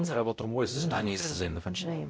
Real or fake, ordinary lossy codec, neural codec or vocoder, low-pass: fake; none; codec, 16 kHz, 0.5 kbps, X-Codec, WavLM features, trained on Multilingual LibriSpeech; none